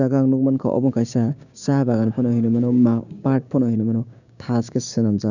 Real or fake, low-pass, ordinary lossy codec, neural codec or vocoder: fake; 7.2 kHz; none; codec, 16 kHz, 6 kbps, DAC